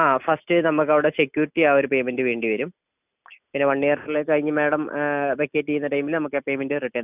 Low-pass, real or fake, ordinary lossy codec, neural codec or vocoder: 3.6 kHz; real; none; none